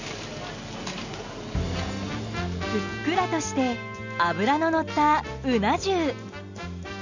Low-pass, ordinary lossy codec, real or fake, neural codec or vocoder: 7.2 kHz; none; real; none